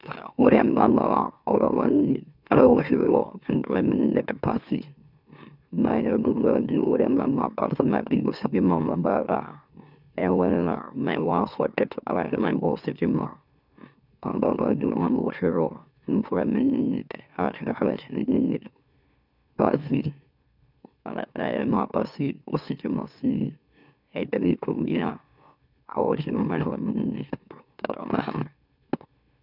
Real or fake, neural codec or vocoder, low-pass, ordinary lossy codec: fake; autoencoder, 44.1 kHz, a latent of 192 numbers a frame, MeloTTS; 5.4 kHz; none